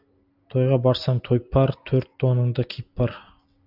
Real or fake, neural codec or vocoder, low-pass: real; none; 5.4 kHz